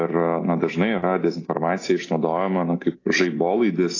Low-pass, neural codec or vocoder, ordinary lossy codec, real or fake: 7.2 kHz; none; AAC, 32 kbps; real